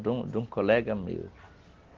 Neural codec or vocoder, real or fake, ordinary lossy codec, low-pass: none; real; Opus, 16 kbps; 7.2 kHz